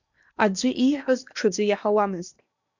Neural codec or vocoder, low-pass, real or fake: codec, 16 kHz in and 24 kHz out, 0.8 kbps, FocalCodec, streaming, 65536 codes; 7.2 kHz; fake